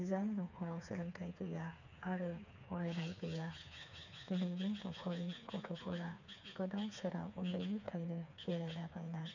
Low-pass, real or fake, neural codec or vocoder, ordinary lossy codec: 7.2 kHz; fake; codec, 16 kHz, 4 kbps, FreqCodec, smaller model; none